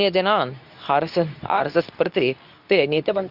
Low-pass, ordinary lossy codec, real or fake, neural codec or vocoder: 5.4 kHz; none; fake; codec, 24 kHz, 0.9 kbps, WavTokenizer, medium speech release version 2